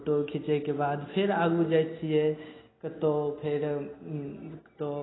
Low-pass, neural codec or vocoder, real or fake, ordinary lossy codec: 7.2 kHz; none; real; AAC, 16 kbps